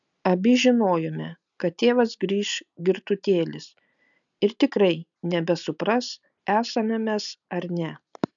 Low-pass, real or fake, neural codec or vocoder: 7.2 kHz; real; none